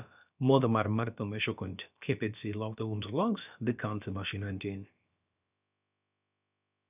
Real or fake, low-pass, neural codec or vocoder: fake; 3.6 kHz; codec, 16 kHz, about 1 kbps, DyCAST, with the encoder's durations